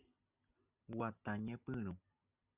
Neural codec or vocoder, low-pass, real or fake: none; 3.6 kHz; real